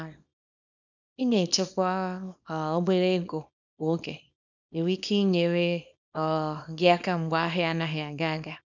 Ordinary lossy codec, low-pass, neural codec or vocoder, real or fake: none; 7.2 kHz; codec, 24 kHz, 0.9 kbps, WavTokenizer, small release; fake